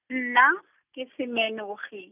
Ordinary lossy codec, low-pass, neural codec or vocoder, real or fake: none; 3.6 kHz; none; real